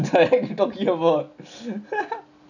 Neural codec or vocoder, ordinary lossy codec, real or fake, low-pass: none; none; real; 7.2 kHz